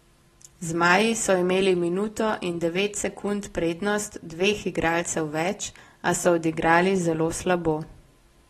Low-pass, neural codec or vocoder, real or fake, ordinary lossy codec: 19.8 kHz; none; real; AAC, 32 kbps